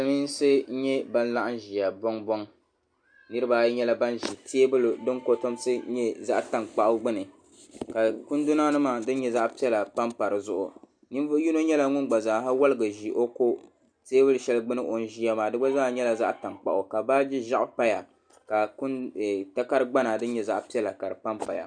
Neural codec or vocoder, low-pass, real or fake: none; 9.9 kHz; real